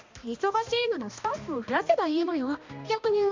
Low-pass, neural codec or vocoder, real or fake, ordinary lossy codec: 7.2 kHz; codec, 16 kHz, 1 kbps, X-Codec, HuBERT features, trained on balanced general audio; fake; MP3, 64 kbps